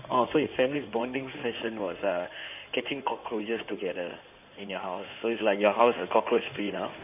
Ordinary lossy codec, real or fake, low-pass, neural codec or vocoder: none; fake; 3.6 kHz; codec, 16 kHz in and 24 kHz out, 2.2 kbps, FireRedTTS-2 codec